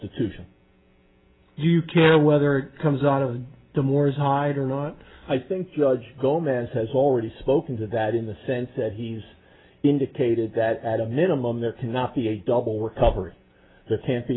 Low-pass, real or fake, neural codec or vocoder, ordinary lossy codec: 7.2 kHz; real; none; AAC, 16 kbps